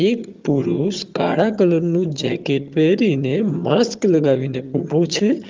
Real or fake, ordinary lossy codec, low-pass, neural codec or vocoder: fake; Opus, 32 kbps; 7.2 kHz; vocoder, 22.05 kHz, 80 mel bands, HiFi-GAN